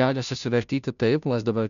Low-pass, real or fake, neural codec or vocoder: 7.2 kHz; fake; codec, 16 kHz, 0.5 kbps, FunCodec, trained on Chinese and English, 25 frames a second